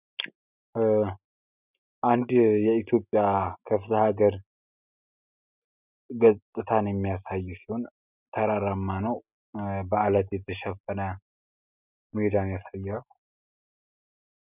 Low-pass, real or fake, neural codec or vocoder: 3.6 kHz; real; none